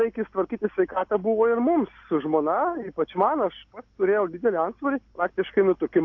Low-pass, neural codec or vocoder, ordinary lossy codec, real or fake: 7.2 kHz; none; AAC, 48 kbps; real